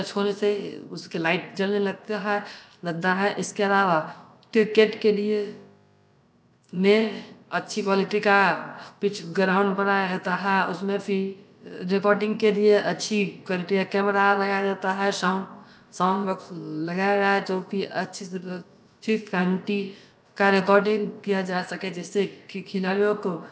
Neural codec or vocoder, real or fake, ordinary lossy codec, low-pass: codec, 16 kHz, about 1 kbps, DyCAST, with the encoder's durations; fake; none; none